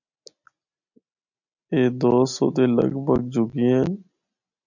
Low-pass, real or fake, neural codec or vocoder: 7.2 kHz; real; none